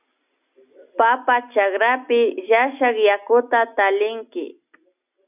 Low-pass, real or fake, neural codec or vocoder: 3.6 kHz; real; none